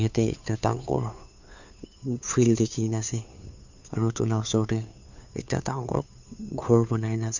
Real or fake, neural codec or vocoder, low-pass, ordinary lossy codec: fake; codec, 16 kHz, 2 kbps, FunCodec, trained on Chinese and English, 25 frames a second; 7.2 kHz; none